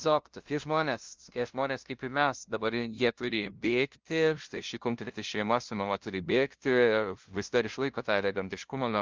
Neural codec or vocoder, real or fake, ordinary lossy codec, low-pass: codec, 16 kHz, 0.5 kbps, FunCodec, trained on LibriTTS, 25 frames a second; fake; Opus, 32 kbps; 7.2 kHz